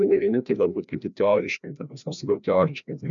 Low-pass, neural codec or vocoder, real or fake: 7.2 kHz; codec, 16 kHz, 1 kbps, FreqCodec, larger model; fake